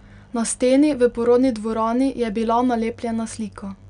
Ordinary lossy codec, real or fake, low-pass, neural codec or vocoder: none; real; 9.9 kHz; none